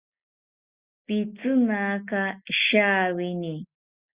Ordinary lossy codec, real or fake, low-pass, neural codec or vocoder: Opus, 64 kbps; real; 3.6 kHz; none